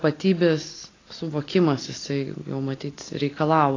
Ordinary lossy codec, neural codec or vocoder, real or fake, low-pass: AAC, 32 kbps; none; real; 7.2 kHz